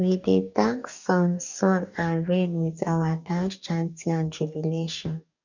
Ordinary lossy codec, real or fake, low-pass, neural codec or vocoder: none; fake; 7.2 kHz; codec, 44.1 kHz, 2.6 kbps, DAC